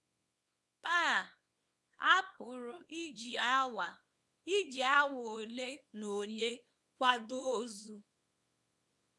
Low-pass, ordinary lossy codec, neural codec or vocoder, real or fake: none; none; codec, 24 kHz, 0.9 kbps, WavTokenizer, small release; fake